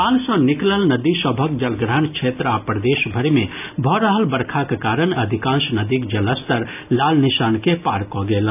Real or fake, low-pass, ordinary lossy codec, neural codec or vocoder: real; 3.6 kHz; AAC, 32 kbps; none